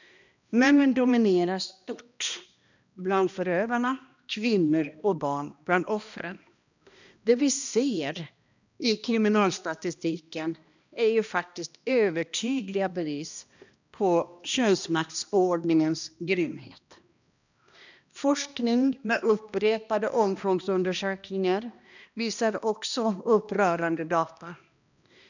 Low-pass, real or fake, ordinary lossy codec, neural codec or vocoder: 7.2 kHz; fake; none; codec, 16 kHz, 1 kbps, X-Codec, HuBERT features, trained on balanced general audio